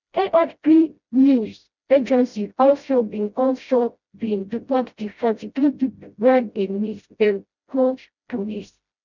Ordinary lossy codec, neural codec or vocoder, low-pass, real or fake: none; codec, 16 kHz, 0.5 kbps, FreqCodec, smaller model; 7.2 kHz; fake